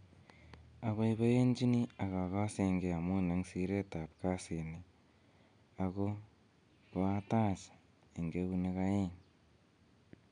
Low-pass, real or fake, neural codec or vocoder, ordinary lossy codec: 9.9 kHz; real; none; none